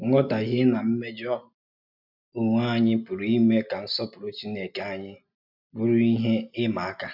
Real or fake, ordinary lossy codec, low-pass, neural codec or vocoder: real; none; 5.4 kHz; none